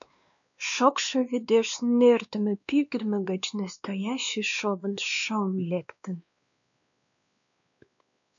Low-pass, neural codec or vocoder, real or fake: 7.2 kHz; codec, 16 kHz, 2 kbps, X-Codec, WavLM features, trained on Multilingual LibriSpeech; fake